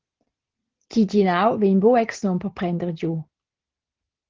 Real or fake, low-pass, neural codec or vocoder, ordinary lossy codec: real; 7.2 kHz; none; Opus, 16 kbps